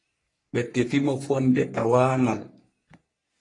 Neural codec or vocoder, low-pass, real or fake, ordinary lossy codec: codec, 44.1 kHz, 3.4 kbps, Pupu-Codec; 10.8 kHz; fake; AAC, 32 kbps